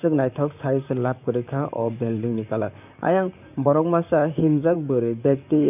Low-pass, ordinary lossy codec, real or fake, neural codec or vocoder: 3.6 kHz; none; fake; vocoder, 44.1 kHz, 128 mel bands, Pupu-Vocoder